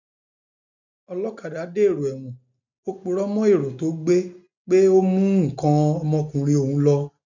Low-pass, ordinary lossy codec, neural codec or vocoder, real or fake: 7.2 kHz; none; none; real